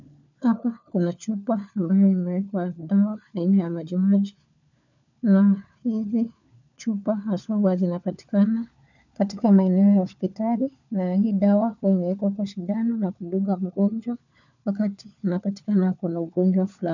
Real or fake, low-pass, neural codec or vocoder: fake; 7.2 kHz; codec, 16 kHz, 4 kbps, FunCodec, trained on LibriTTS, 50 frames a second